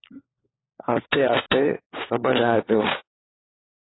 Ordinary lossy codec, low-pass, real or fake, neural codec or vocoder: AAC, 16 kbps; 7.2 kHz; fake; codec, 16 kHz, 4 kbps, FunCodec, trained on LibriTTS, 50 frames a second